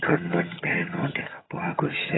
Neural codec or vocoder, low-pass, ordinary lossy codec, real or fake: vocoder, 22.05 kHz, 80 mel bands, HiFi-GAN; 7.2 kHz; AAC, 16 kbps; fake